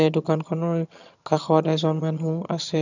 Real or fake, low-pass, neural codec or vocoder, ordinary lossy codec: fake; 7.2 kHz; vocoder, 22.05 kHz, 80 mel bands, HiFi-GAN; none